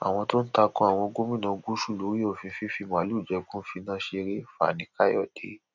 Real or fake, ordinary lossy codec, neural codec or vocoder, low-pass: real; none; none; 7.2 kHz